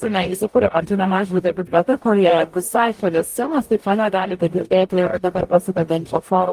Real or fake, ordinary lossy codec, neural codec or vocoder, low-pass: fake; Opus, 32 kbps; codec, 44.1 kHz, 0.9 kbps, DAC; 14.4 kHz